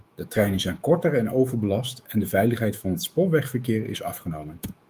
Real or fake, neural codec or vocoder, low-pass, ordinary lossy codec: fake; autoencoder, 48 kHz, 128 numbers a frame, DAC-VAE, trained on Japanese speech; 14.4 kHz; Opus, 32 kbps